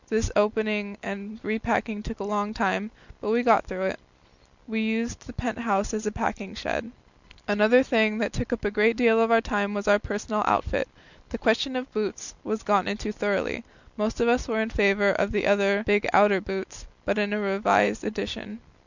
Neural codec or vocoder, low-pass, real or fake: none; 7.2 kHz; real